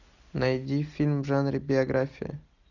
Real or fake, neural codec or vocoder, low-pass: real; none; 7.2 kHz